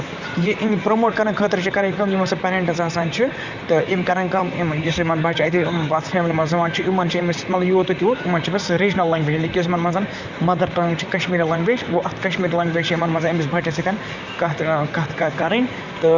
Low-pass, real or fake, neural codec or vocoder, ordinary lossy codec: 7.2 kHz; fake; vocoder, 44.1 kHz, 128 mel bands, Pupu-Vocoder; Opus, 64 kbps